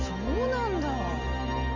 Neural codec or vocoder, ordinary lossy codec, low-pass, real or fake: none; none; 7.2 kHz; real